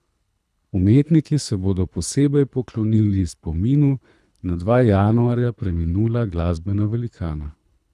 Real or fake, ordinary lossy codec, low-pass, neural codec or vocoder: fake; none; none; codec, 24 kHz, 3 kbps, HILCodec